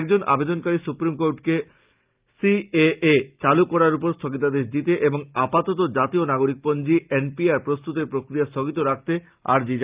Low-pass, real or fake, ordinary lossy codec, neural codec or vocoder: 3.6 kHz; real; Opus, 24 kbps; none